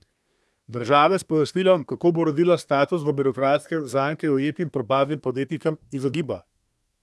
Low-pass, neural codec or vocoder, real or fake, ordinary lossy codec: none; codec, 24 kHz, 1 kbps, SNAC; fake; none